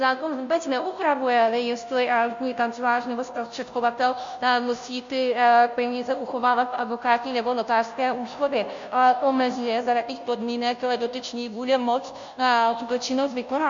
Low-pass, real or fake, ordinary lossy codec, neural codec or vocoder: 7.2 kHz; fake; MP3, 64 kbps; codec, 16 kHz, 0.5 kbps, FunCodec, trained on Chinese and English, 25 frames a second